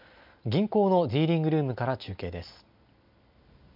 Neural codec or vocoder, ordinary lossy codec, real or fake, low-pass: none; none; real; 5.4 kHz